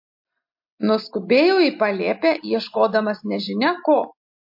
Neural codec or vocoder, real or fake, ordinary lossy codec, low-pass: none; real; MP3, 32 kbps; 5.4 kHz